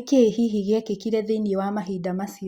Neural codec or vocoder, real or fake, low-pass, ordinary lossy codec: none; real; 19.8 kHz; Opus, 64 kbps